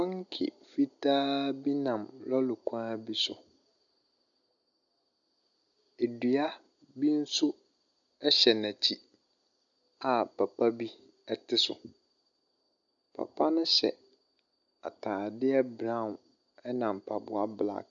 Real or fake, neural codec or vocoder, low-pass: real; none; 7.2 kHz